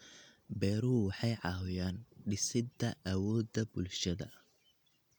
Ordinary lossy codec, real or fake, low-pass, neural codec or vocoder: none; real; 19.8 kHz; none